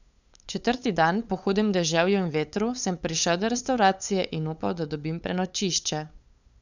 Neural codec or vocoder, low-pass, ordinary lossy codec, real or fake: codec, 16 kHz, 8 kbps, FunCodec, trained on LibriTTS, 25 frames a second; 7.2 kHz; none; fake